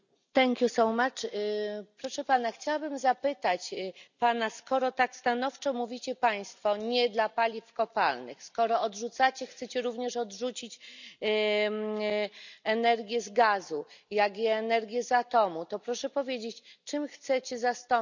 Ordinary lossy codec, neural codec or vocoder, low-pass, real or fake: none; none; 7.2 kHz; real